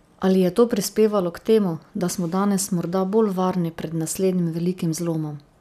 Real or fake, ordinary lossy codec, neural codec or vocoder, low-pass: real; none; none; 14.4 kHz